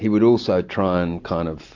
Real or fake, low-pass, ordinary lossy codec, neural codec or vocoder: real; 7.2 kHz; AAC, 48 kbps; none